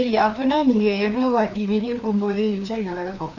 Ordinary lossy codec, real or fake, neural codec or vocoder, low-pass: none; fake; codec, 16 kHz, 2 kbps, FreqCodec, larger model; 7.2 kHz